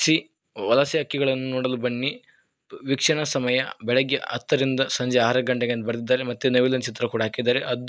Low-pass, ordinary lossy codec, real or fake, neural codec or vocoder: none; none; real; none